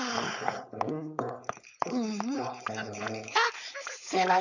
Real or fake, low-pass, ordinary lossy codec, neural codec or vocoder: fake; 7.2 kHz; none; codec, 16 kHz, 4.8 kbps, FACodec